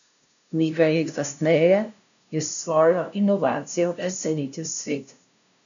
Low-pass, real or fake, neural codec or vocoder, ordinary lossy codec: 7.2 kHz; fake; codec, 16 kHz, 0.5 kbps, FunCodec, trained on LibriTTS, 25 frames a second; none